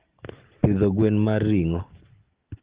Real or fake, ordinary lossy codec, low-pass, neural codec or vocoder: real; Opus, 16 kbps; 3.6 kHz; none